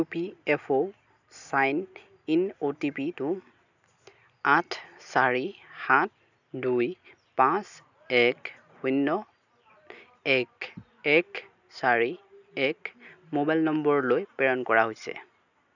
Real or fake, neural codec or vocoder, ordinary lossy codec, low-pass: real; none; none; 7.2 kHz